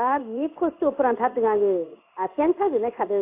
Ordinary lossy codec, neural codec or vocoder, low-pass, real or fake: AAC, 32 kbps; codec, 16 kHz in and 24 kHz out, 1 kbps, XY-Tokenizer; 3.6 kHz; fake